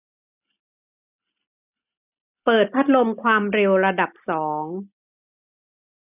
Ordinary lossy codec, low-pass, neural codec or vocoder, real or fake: none; 3.6 kHz; none; real